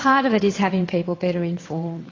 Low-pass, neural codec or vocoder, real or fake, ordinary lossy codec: 7.2 kHz; vocoder, 22.05 kHz, 80 mel bands, WaveNeXt; fake; AAC, 32 kbps